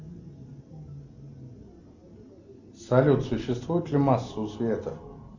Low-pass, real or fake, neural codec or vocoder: 7.2 kHz; real; none